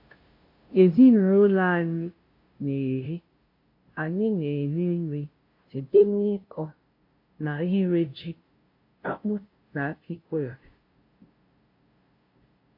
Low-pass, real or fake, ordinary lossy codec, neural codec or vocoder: 5.4 kHz; fake; MP3, 32 kbps; codec, 16 kHz, 0.5 kbps, FunCodec, trained on LibriTTS, 25 frames a second